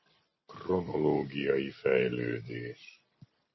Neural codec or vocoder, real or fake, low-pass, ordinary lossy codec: none; real; 7.2 kHz; MP3, 24 kbps